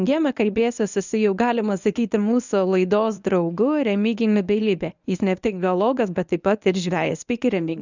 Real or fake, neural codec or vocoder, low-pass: fake; codec, 24 kHz, 0.9 kbps, WavTokenizer, medium speech release version 1; 7.2 kHz